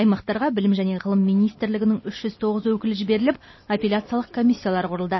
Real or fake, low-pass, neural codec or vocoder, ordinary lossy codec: real; 7.2 kHz; none; MP3, 24 kbps